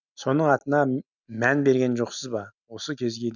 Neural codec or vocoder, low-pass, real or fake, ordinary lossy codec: none; 7.2 kHz; real; none